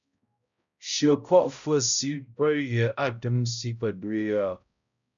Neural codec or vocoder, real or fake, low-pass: codec, 16 kHz, 0.5 kbps, X-Codec, HuBERT features, trained on balanced general audio; fake; 7.2 kHz